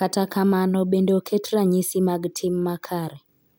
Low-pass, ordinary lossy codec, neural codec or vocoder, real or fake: none; none; none; real